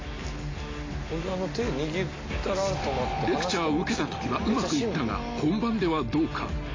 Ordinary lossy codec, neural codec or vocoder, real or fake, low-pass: AAC, 32 kbps; none; real; 7.2 kHz